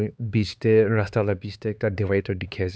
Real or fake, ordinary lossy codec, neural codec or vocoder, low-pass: fake; none; codec, 16 kHz, 4 kbps, X-Codec, HuBERT features, trained on LibriSpeech; none